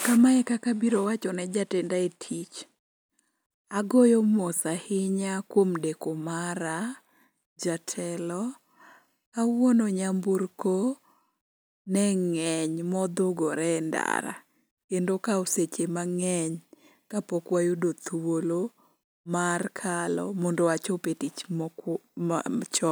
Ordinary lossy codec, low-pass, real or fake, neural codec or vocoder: none; none; real; none